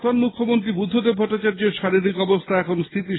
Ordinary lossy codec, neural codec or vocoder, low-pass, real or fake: AAC, 16 kbps; none; 7.2 kHz; real